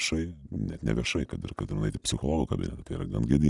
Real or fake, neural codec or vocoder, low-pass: real; none; 10.8 kHz